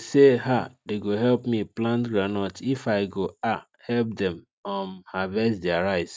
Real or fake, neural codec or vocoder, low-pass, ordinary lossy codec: real; none; none; none